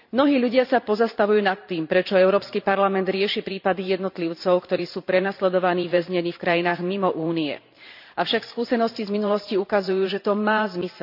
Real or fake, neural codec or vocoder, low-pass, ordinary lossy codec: fake; vocoder, 44.1 kHz, 128 mel bands every 512 samples, BigVGAN v2; 5.4 kHz; none